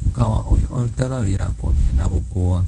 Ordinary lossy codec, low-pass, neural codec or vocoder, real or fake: none; 10.8 kHz; codec, 24 kHz, 0.9 kbps, WavTokenizer, medium speech release version 1; fake